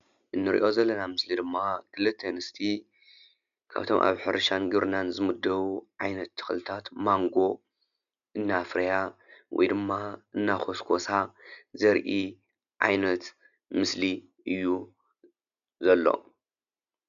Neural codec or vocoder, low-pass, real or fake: none; 7.2 kHz; real